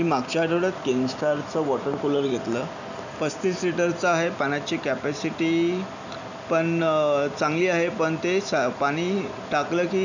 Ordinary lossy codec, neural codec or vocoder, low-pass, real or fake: none; none; 7.2 kHz; real